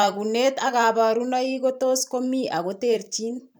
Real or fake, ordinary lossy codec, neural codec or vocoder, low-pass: fake; none; vocoder, 44.1 kHz, 128 mel bands every 512 samples, BigVGAN v2; none